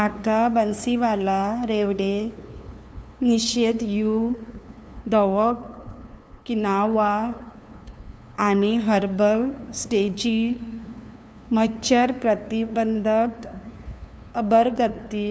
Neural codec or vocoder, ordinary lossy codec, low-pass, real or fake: codec, 16 kHz, 2 kbps, FunCodec, trained on LibriTTS, 25 frames a second; none; none; fake